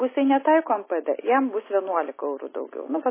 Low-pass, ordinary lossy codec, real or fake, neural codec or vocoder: 3.6 kHz; MP3, 16 kbps; real; none